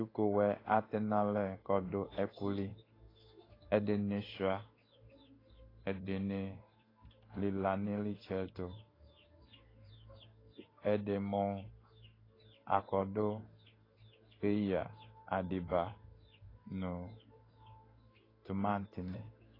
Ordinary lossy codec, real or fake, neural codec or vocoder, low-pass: AAC, 24 kbps; fake; codec, 16 kHz in and 24 kHz out, 1 kbps, XY-Tokenizer; 5.4 kHz